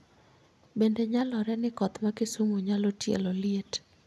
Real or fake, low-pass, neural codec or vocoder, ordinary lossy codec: real; none; none; none